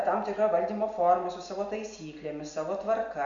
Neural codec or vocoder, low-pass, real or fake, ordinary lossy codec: none; 7.2 kHz; real; Opus, 64 kbps